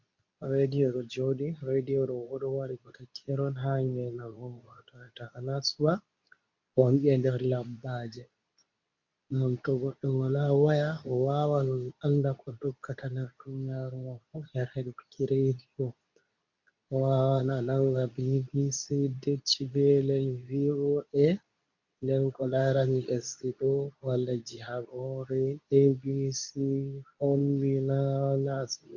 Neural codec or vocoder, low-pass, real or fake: codec, 24 kHz, 0.9 kbps, WavTokenizer, medium speech release version 2; 7.2 kHz; fake